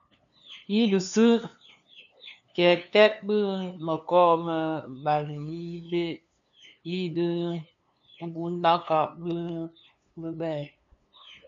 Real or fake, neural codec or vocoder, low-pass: fake; codec, 16 kHz, 2 kbps, FunCodec, trained on LibriTTS, 25 frames a second; 7.2 kHz